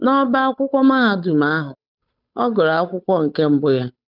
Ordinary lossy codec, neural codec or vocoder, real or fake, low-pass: none; codec, 16 kHz, 8 kbps, FunCodec, trained on Chinese and English, 25 frames a second; fake; 5.4 kHz